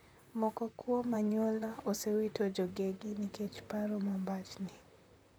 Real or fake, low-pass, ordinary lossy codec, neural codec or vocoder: fake; none; none; vocoder, 44.1 kHz, 128 mel bands, Pupu-Vocoder